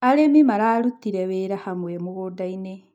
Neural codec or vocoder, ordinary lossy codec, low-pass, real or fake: none; MP3, 96 kbps; 19.8 kHz; real